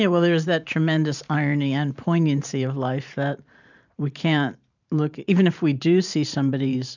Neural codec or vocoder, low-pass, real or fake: vocoder, 44.1 kHz, 128 mel bands every 512 samples, BigVGAN v2; 7.2 kHz; fake